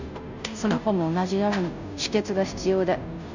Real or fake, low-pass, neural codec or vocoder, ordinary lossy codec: fake; 7.2 kHz; codec, 16 kHz, 0.5 kbps, FunCodec, trained on Chinese and English, 25 frames a second; none